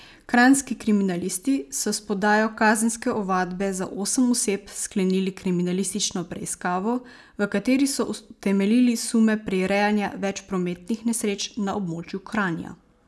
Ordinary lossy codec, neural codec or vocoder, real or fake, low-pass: none; none; real; none